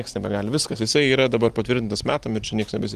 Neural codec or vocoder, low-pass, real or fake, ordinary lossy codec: none; 14.4 kHz; real; Opus, 24 kbps